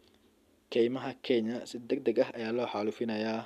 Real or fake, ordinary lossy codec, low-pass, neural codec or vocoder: real; none; 14.4 kHz; none